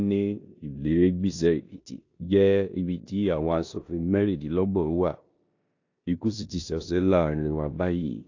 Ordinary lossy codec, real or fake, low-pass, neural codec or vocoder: AAC, 48 kbps; fake; 7.2 kHz; codec, 16 kHz in and 24 kHz out, 0.9 kbps, LongCat-Audio-Codec, four codebook decoder